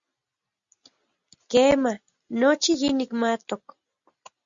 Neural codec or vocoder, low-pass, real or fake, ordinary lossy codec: none; 7.2 kHz; real; Opus, 64 kbps